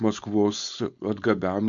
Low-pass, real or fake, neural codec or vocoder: 7.2 kHz; fake; codec, 16 kHz, 4.8 kbps, FACodec